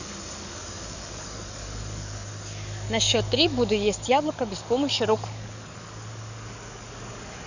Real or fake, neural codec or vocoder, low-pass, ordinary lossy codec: fake; codec, 44.1 kHz, 7.8 kbps, DAC; 7.2 kHz; none